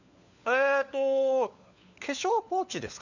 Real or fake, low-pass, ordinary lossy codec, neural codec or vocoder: fake; 7.2 kHz; none; codec, 16 kHz, 4 kbps, FunCodec, trained on LibriTTS, 50 frames a second